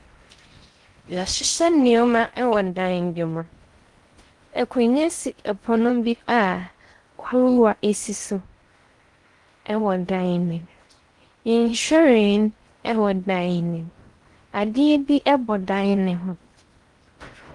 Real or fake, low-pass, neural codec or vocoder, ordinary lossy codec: fake; 10.8 kHz; codec, 16 kHz in and 24 kHz out, 0.6 kbps, FocalCodec, streaming, 4096 codes; Opus, 24 kbps